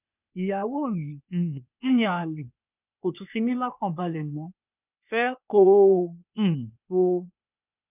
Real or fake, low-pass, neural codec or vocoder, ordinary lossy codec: fake; 3.6 kHz; codec, 16 kHz, 0.8 kbps, ZipCodec; none